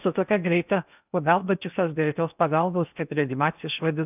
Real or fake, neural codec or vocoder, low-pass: fake; codec, 16 kHz in and 24 kHz out, 0.8 kbps, FocalCodec, streaming, 65536 codes; 3.6 kHz